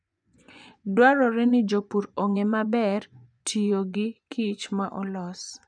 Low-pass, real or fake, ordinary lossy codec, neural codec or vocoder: 9.9 kHz; fake; none; vocoder, 24 kHz, 100 mel bands, Vocos